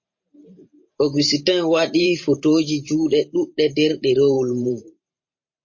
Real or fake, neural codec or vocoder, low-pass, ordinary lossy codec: fake; vocoder, 44.1 kHz, 128 mel bands every 512 samples, BigVGAN v2; 7.2 kHz; MP3, 32 kbps